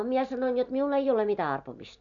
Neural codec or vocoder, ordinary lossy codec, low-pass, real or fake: none; none; 7.2 kHz; real